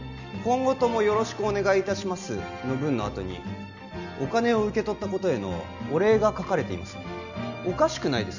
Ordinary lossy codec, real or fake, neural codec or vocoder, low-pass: none; real; none; 7.2 kHz